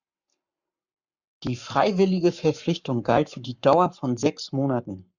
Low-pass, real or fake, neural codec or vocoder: 7.2 kHz; fake; codec, 44.1 kHz, 7.8 kbps, Pupu-Codec